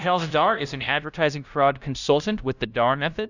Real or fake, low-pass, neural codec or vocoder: fake; 7.2 kHz; codec, 16 kHz, 0.5 kbps, FunCodec, trained on LibriTTS, 25 frames a second